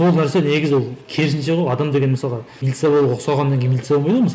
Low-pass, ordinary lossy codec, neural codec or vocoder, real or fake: none; none; none; real